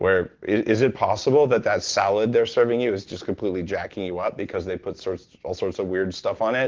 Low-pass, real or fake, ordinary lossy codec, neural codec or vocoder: 7.2 kHz; real; Opus, 16 kbps; none